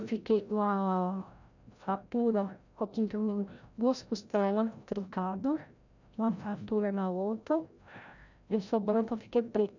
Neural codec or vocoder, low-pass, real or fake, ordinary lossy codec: codec, 16 kHz, 0.5 kbps, FreqCodec, larger model; 7.2 kHz; fake; none